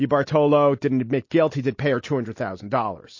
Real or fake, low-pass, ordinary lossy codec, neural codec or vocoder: real; 7.2 kHz; MP3, 32 kbps; none